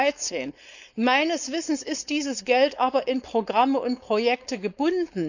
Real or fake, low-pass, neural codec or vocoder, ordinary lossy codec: fake; 7.2 kHz; codec, 16 kHz, 4.8 kbps, FACodec; none